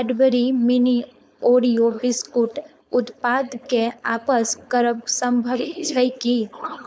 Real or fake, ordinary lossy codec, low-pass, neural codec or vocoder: fake; none; none; codec, 16 kHz, 4.8 kbps, FACodec